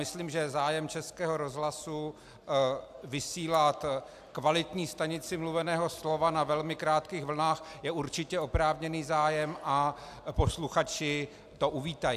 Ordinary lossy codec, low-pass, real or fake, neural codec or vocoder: AAC, 96 kbps; 14.4 kHz; real; none